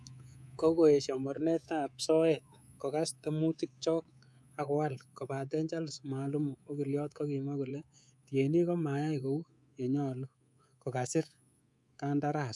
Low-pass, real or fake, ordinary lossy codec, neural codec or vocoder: none; fake; none; codec, 24 kHz, 3.1 kbps, DualCodec